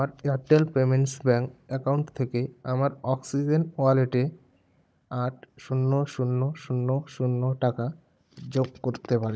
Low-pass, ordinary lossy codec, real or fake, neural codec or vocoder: none; none; fake; codec, 16 kHz, 16 kbps, FunCodec, trained on Chinese and English, 50 frames a second